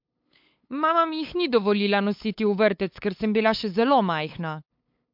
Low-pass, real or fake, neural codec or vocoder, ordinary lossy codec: 5.4 kHz; fake; codec, 16 kHz, 8 kbps, FunCodec, trained on LibriTTS, 25 frames a second; MP3, 48 kbps